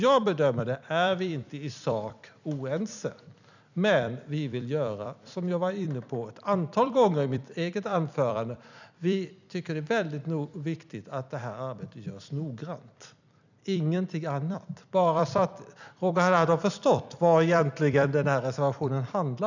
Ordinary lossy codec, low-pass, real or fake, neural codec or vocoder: none; 7.2 kHz; fake; vocoder, 44.1 kHz, 128 mel bands every 256 samples, BigVGAN v2